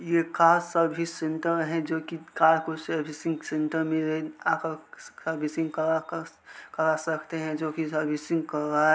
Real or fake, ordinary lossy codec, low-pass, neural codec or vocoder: real; none; none; none